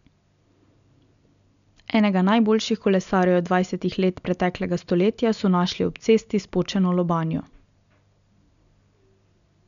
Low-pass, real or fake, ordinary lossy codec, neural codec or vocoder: 7.2 kHz; real; none; none